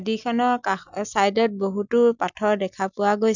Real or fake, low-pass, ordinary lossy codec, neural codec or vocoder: real; 7.2 kHz; none; none